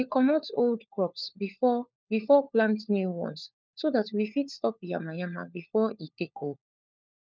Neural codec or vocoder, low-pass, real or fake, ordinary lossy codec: codec, 16 kHz, 4 kbps, FunCodec, trained on LibriTTS, 50 frames a second; none; fake; none